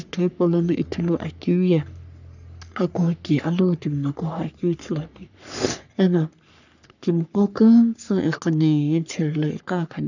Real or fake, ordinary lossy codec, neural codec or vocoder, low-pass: fake; none; codec, 44.1 kHz, 3.4 kbps, Pupu-Codec; 7.2 kHz